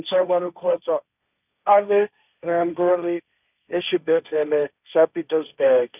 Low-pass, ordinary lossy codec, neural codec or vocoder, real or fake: 3.6 kHz; none; codec, 16 kHz, 1.1 kbps, Voila-Tokenizer; fake